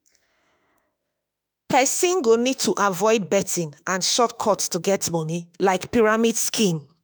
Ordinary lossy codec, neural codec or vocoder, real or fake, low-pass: none; autoencoder, 48 kHz, 32 numbers a frame, DAC-VAE, trained on Japanese speech; fake; none